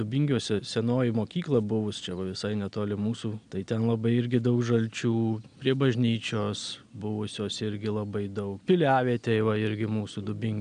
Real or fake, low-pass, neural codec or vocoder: real; 9.9 kHz; none